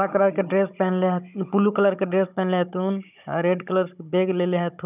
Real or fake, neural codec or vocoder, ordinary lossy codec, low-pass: fake; codec, 16 kHz, 16 kbps, FunCodec, trained on Chinese and English, 50 frames a second; none; 3.6 kHz